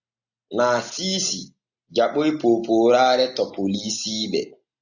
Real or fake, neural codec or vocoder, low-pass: real; none; 7.2 kHz